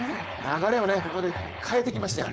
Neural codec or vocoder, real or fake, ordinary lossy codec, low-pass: codec, 16 kHz, 4.8 kbps, FACodec; fake; none; none